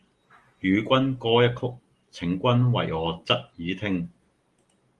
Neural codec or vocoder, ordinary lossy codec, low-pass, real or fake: none; Opus, 24 kbps; 10.8 kHz; real